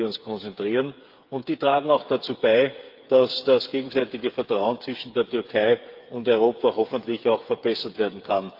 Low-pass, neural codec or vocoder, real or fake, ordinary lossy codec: 5.4 kHz; codec, 16 kHz, 8 kbps, FreqCodec, smaller model; fake; Opus, 32 kbps